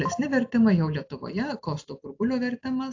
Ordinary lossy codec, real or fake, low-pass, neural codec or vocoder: AAC, 48 kbps; real; 7.2 kHz; none